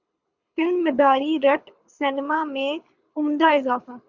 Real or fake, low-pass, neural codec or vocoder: fake; 7.2 kHz; codec, 24 kHz, 6 kbps, HILCodec